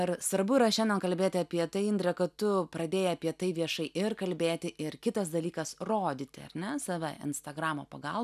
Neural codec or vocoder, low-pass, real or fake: none; 14.4 kHz; real